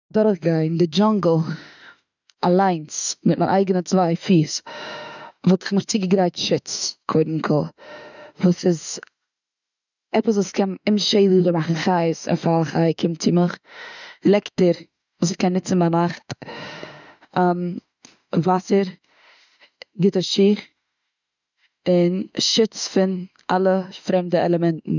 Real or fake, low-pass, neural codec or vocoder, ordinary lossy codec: fake; 7.2 kHz; autoencoder, 48 kHz, 32 numbers a frame, DAC-VAE, trained on Japanese speech; none